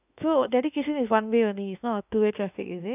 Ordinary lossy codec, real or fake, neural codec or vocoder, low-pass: none; fake; autoencoder, 48 kHz, 32 numbers a frame, DAC-VAE, trained on Japanese speech; 3.6 kHz